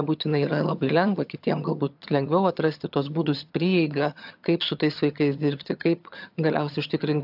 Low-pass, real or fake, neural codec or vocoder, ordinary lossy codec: 5.4 kHz; fake; vocoder, 22.05 kHz, 80 mel bands, HiFi-GAN; AAC, 48 kbps